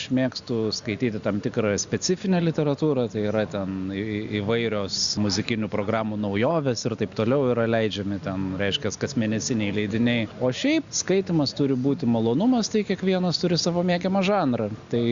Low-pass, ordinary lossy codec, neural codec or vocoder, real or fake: 7.2 kHz; Opus, 64 kbps; none; real